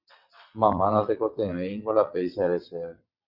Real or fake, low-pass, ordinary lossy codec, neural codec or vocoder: fake; 5.4 kHz; Opus, 64 kbps; vocoder, 22.05 kHz, 80 mel bands, WaveNeXt